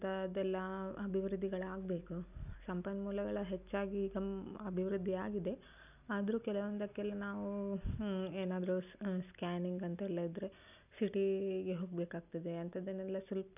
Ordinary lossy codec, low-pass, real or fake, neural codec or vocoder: none; 3.6 kHz; real; none